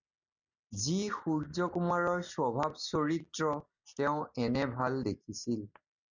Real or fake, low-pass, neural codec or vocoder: real; 7.2 kHz; none